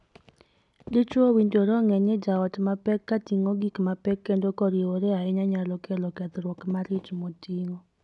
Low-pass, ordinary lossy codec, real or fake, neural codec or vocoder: 10.8 kHz; none; real; none